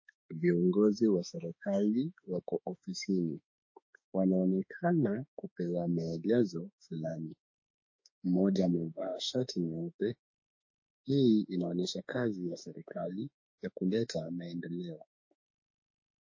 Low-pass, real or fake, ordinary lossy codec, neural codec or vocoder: 7.2 kHz; fake; MP3, 32 kbps; autoencoder, 48 kHz, 32 numbers a frame, DAC-VAE, trained on Japanese speech